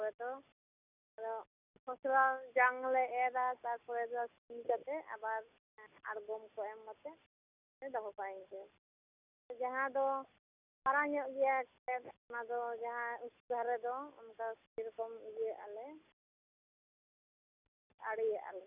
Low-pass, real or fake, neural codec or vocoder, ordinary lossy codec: 3.6 kHz; real; none; none